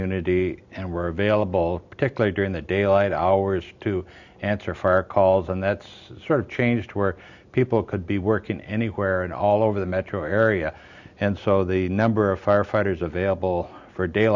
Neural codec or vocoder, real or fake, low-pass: none; real; 7.2 kHz